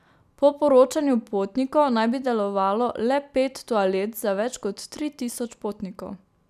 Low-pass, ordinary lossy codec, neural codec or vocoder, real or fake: 14.4 kHz; none; none; real